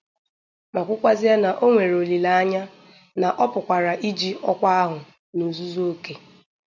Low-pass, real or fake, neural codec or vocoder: 7.2 kHz; real; none